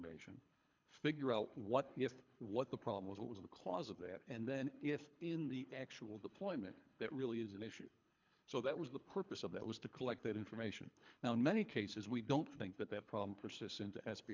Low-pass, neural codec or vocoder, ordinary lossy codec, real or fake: 7.2 kHz; codec, 24 kHz, 3 kbps, HILCodec; MP3, 64 kbps; fake